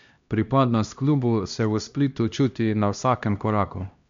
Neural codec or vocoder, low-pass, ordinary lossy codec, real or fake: codec, 16 kHz, 1 kbps, X-Codec, HuBERT features, trained on LibriSpeech; 7.2 kHz; MP3, 64 kbps; fake